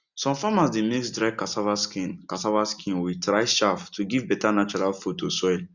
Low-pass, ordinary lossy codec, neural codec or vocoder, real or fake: 7.2 kHz; none; none; real